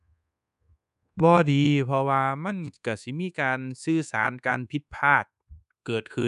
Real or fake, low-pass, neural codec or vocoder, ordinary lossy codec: fake; 10.8 kHz; codec, 24 kHz, 1.2 kbps, DualCodec; none